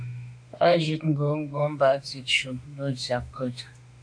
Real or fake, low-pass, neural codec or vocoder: fake; 9.9 kHz; autoencoder, 48 kHz, 32 numbers a frame, DAC-VAE, trained on Japanese speech